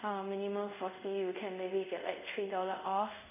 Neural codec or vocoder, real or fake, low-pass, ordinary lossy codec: codec, 24 kHz, 0.5 kbps, DualCodec; fake; 3.6 kHz; none